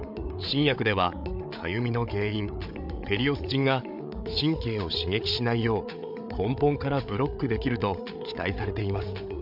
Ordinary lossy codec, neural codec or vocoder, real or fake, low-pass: none; codec, 16 kHz, 8 kbps, FreqCodec, larger model; fake; 5.4 kHz